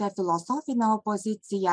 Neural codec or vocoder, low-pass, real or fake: none; 9.9 kHz; real